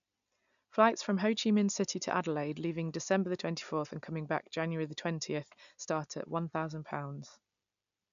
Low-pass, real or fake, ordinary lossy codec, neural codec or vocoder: 7.2 kHz; real; none; none